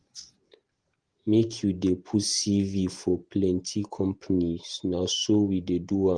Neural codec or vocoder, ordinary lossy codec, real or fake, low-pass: vocoder, 48 kHz, 128 mel bands, Vocos; Opus, 24 kbps; fake; 9.9 kHz